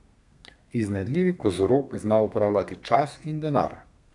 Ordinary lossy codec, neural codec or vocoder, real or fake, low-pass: none; codec, 32 kHz, 1.9 kbps, SNAC; fake; 10.8 kHz